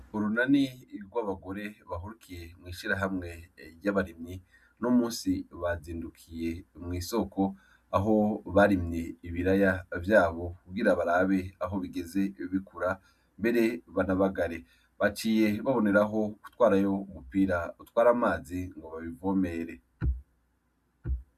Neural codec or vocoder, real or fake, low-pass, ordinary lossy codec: none; real; 14.4 kHz; MP3, 96 kbps